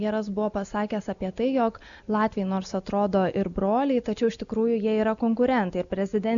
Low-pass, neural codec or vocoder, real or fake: 7.2 kHz; none; real